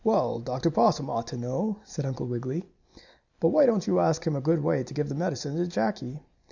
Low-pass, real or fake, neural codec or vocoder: 7.2 kHz; real; none